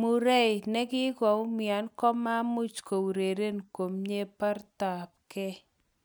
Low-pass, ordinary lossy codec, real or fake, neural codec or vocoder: none; none; real; none